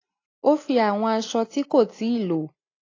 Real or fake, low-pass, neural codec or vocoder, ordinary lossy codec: real; 7.2 kHz; none; AAC, 32 kbps